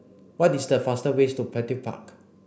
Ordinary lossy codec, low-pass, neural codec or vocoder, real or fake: none; none; none; real